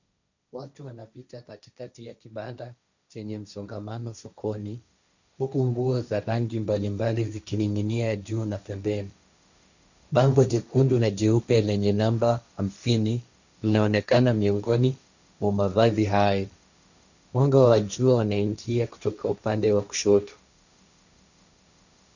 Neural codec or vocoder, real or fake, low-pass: codec, 16 kHz, 1.1 kbps, Voila-Tokenizer; fake; 7.2 kHz